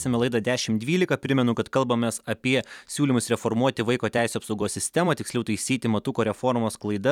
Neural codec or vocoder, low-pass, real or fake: vocoder, 44.1 kHz, 128 mel bands every 256 samples, BigVGAN v2; 19.8 kHz; fake